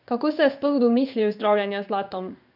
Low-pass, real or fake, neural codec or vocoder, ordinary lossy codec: 5.4 kHz; fake; codec, 16 kHz in and 24 kHz out, 1 kbps, XY-Tokenizer; none